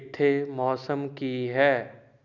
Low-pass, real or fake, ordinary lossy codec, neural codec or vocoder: 7.2 kHz; real; none; none